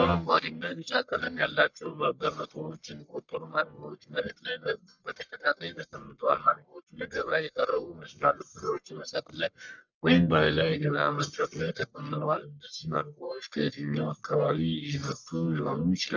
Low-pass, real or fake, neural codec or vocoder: 7.2 kHz; fake; codec, 44.1 kHz, 1.7 kbps, Pupu-Codec